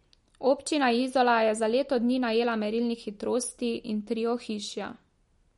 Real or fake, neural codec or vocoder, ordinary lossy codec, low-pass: real; none; MP3, 48 kbps; 14.4 kHz